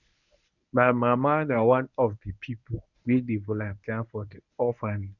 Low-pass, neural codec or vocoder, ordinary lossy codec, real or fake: 7.2 kHz; codec, 24 kHz, 0.9 kbps, WavTokenizer, medium speech release version 1; none; fake